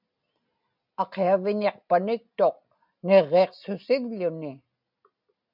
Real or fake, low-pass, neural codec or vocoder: real; 5.4 kHz; none